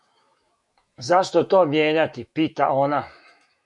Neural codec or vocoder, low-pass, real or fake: autoencoder, 48 kHz, 128 numbers a frame, DAC-VAE, trained on Japanese speech; 10.8 kHz; fake